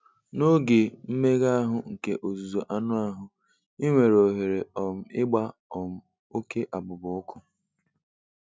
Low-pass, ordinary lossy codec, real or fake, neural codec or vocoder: none; none; real; none